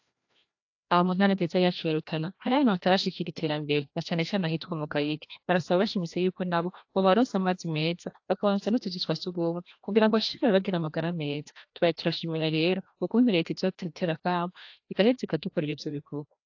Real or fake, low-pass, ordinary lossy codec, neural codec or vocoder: fake; 7.2 kHz; AAC, 48 kbps; codec, 16 kHz, 1 kbps, FreqCodec, larger model